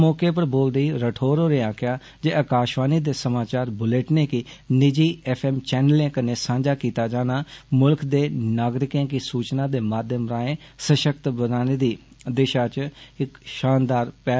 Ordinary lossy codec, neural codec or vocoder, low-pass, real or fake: none; none; none; real